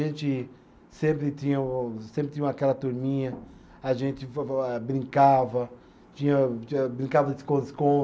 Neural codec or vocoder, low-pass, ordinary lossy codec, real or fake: none; none; none; real